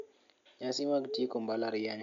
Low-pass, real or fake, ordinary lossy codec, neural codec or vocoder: 7.2 kHz; real; MP3, 64 kbps; none